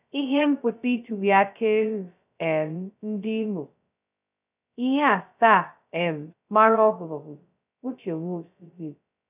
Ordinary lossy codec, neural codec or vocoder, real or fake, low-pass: none; codec, 16 kHz, 0.2 kbps, FocalCodec; fake; 3.6 kHz